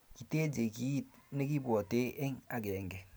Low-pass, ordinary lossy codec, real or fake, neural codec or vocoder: none; none; real; none